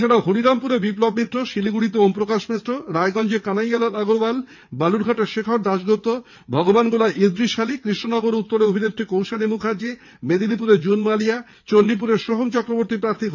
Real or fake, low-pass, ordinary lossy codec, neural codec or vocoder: fake; 7.2 kHz; none; vocoder, 22.05 kHz, 80 mel bands, WaveNeXt